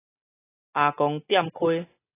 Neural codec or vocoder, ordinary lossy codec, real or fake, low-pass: none; AAC, 16 kbps; real; 3.6 kHz